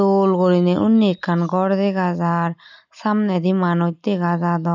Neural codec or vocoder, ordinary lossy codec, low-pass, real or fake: none; none; 7.2 kHz; real